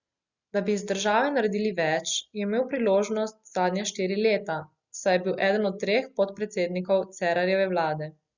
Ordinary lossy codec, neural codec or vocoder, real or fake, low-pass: Opus, 64 kbps; none; real; 7.2 kHz